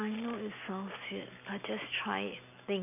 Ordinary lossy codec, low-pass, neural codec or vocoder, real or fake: none; 3.6 kHz; none; real